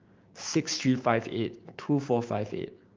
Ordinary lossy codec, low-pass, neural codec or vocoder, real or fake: Opus, 24 kbps; 7.2 kHz; codec, 16 kHz, 6 kbps, DAC; fake